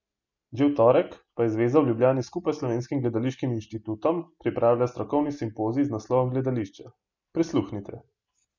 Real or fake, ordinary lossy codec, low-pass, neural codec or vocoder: real; none; 7.2 kHz; none